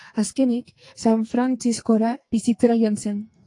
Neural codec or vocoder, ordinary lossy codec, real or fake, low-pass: codec, 44.1 kHz, 2.6 kbps, SNAC; AAC, 48 kbps; fake; 10.8 kHz